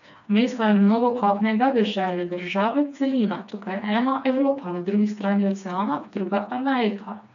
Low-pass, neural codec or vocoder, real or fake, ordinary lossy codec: 7.2 kHz; codec, 16 kHz, 2 kbps, FreqCodec, smaller model; fake; none